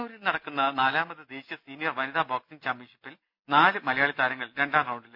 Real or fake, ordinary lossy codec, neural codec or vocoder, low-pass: real; none; none; 5.4 kHz